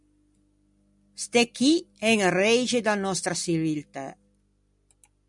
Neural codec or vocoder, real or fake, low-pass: none; real; 10.8 kHz